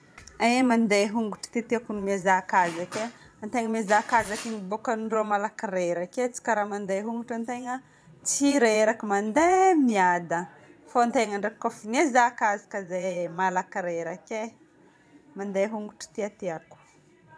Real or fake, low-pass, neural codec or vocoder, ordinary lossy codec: fake; none; vocoder, 22.05 kHz, 80 mel bands, Vocos; none